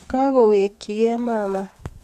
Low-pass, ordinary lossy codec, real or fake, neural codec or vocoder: 14.4 kHz; none; fake; codec, 32 kHz, 1.9 kbps, SNAC